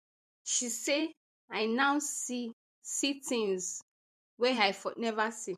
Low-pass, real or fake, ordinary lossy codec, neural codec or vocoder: 14.4 kHz; fake; MP3, 64 kbps; vocoder, 44.1 kHz, 128 mel bands every 512 samples, BigVGAN v2